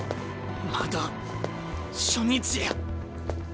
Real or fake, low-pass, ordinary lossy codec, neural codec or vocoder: real; none; none; none